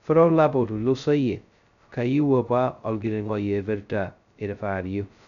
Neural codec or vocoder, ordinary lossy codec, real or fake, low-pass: codec, 16 kHz, 0.2 kbps, FocalCodec; none; fake; 7.2 kHz